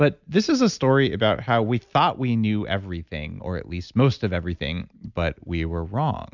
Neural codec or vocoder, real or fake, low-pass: none; real; 7.2 kHz